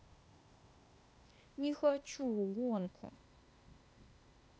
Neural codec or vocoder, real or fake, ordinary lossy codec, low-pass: codec, 16 kHz, 0.8 kbps, ZipCodec; fake; none; none